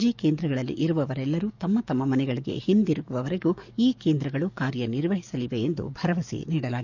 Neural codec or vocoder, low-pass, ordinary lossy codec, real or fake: codec, 44.1 kHz, 7.8 kbps, DAC; 7.2 kHz; none; fake